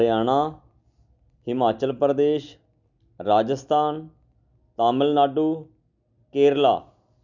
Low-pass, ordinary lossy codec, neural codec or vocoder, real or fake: 7.2 kHz; none; none; real